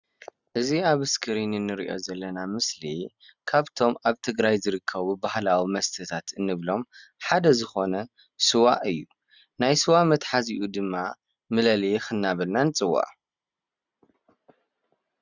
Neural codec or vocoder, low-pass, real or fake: none; 7.2 kHz; real